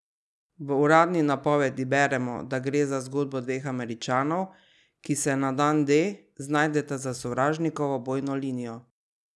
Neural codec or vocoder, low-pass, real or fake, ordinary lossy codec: none; none; real; none